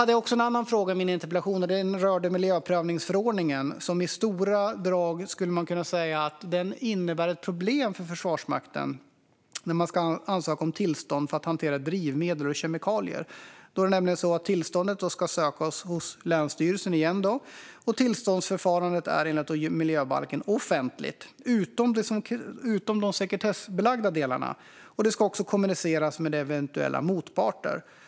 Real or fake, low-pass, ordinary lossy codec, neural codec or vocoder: real; none; none; none